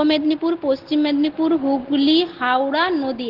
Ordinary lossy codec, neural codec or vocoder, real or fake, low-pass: Opus, 16 kbps; none; real; 5.4 kHz